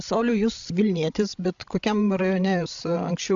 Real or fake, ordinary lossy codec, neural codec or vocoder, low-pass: fake; AAC, 64 kbps; codec, 16 kHz, 16 kbps, FreqCodec, larger model; 7.2 kHz